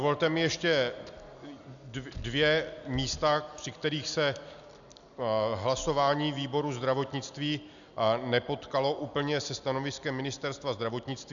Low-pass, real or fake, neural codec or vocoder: 7.2 kHz; real; none